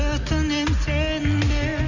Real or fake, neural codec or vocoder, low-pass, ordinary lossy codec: real; none; 7.2 kHz; none